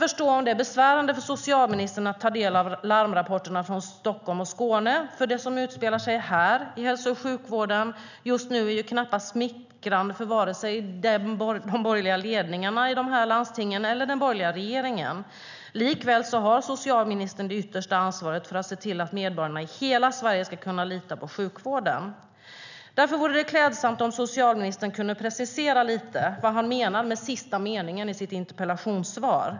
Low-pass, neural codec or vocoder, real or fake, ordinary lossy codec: 7.2 kHz; none; real; none